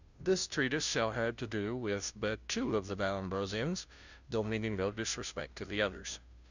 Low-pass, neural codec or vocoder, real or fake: 7.2 kHz; codec, 16 kHz, 0.5 kbps, FunCodec, trained on Chinese and English, 25 frames a second; fake